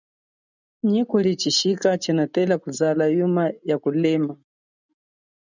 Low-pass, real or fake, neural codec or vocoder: 7.2 kHz; real; none